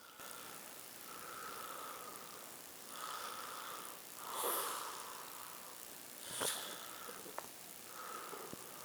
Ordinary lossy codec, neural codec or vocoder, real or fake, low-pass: none; codec, 44.1 kHz, 7.8 kbps, Pupu-Codec; fake; none